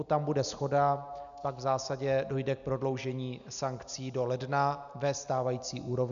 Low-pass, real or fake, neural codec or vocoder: 7.2 kHz; real; none